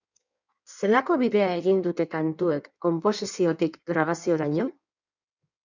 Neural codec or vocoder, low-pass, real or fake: codec, 16 kHz in and 24 kHz out, 1.1 kbps, FireRedTTS-2 codec; 7.2 kHz; fake